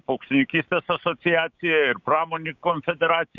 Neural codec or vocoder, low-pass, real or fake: codec, 16 kHz, 6 kbps, DAC; 7.2 kHz; fake